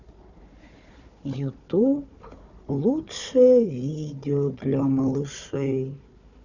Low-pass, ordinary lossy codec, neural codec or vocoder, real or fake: 7.2 kHz; none; codec, 16 kHz, 4 kbps, FunCodec, trained on Chinese and English, 50 frames a second; fake